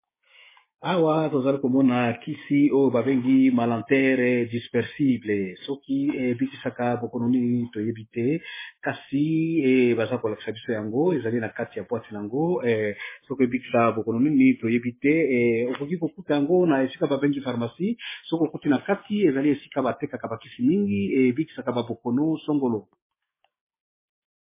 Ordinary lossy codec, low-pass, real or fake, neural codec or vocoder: MP3, 16 kbps; 3.6 kHz; fake; codec, 16 kHz, 6 kbps, DAC